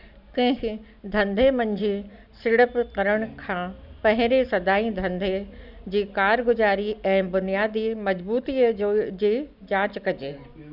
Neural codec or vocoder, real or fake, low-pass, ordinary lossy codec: none; real; 5.4 kHz; none